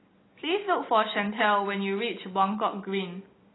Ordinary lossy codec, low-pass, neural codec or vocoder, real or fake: AAC, 16 kbps; 7.2 kHz; none; real